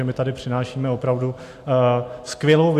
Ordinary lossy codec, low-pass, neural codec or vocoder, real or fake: AAC, 64 kbps; 14.4 kHz; none; real